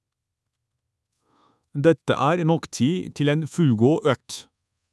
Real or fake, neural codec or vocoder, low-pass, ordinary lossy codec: fake; codec, 24 kHz, 1.2 kbps, DualCodec; none; none